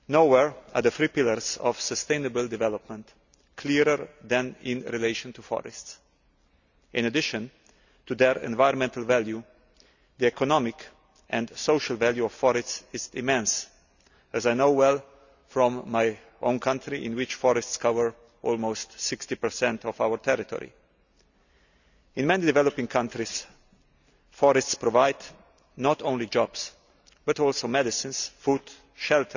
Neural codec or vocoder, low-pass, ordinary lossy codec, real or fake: none; 7.2 kHz; none; real